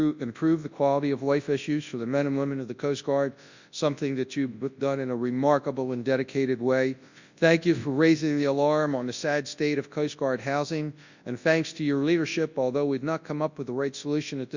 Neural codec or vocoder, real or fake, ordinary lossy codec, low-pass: codec, 24 kHz, 0.9 kbps, WavTokenizer, large speech release; fake; Opus, 64 kbps; 7.2 kHz